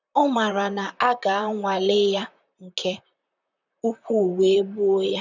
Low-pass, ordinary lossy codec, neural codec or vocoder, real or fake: 7.2 kHz; none; vocoder, 44.1 kHz, 128 mel bands, Pupu-Vocoder; fake